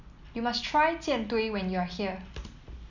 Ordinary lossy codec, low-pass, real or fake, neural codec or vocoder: none; 7.2 kHz; real; none